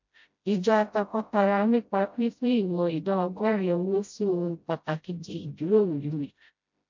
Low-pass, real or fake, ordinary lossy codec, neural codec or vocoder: 7.2 kHz; fake; MP3, 48 kbps; codec, 16 kHz, 0.5 kbps, FreqCodec, smaller model